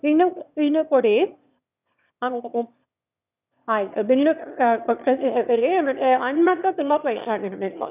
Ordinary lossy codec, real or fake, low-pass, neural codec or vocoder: none; fake; 3.6 kHz; autoencoder, 22.05 kHz, a latent of 192 numbers a frame, VITS, trained on one speaker